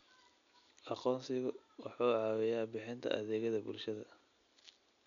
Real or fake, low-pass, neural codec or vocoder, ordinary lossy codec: real; 7.2 kHz; none; none